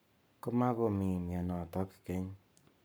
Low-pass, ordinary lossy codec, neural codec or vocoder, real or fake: none; none; codec, 44.1 kHz, 7.8 kbps, Pupu-Codec; fake